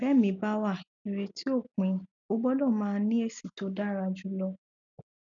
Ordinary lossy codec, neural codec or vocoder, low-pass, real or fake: none; none; 7.2 kHz; real